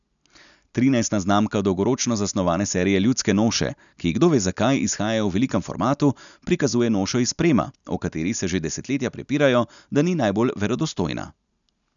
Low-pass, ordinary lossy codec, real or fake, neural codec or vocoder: 7.2 kHz; none; real; none